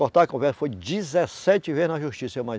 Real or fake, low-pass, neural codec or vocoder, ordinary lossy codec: real; none; none; none